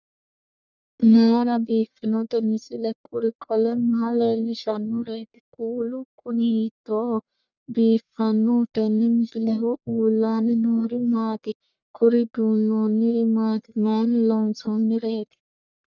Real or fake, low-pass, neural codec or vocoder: fake; 7.2 kHz; codec, 44.1 kHz, 1.7 kbps, Pupu-Codec